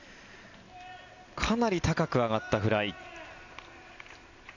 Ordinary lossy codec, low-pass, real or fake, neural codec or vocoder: none; 7.2 kHz; real; none